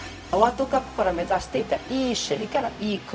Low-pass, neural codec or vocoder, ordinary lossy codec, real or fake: none; codec, 16 kHz, 0.4 kbps, LongCat-Audio-Codec; none; fake